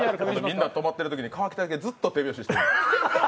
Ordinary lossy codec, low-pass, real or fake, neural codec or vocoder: none; none; real; none